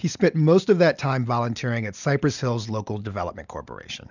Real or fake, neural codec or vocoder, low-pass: real; none; 7.2 kHz